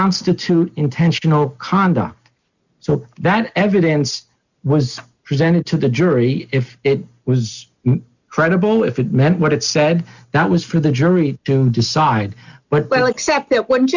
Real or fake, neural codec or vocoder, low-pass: real; none; 7.2 kHz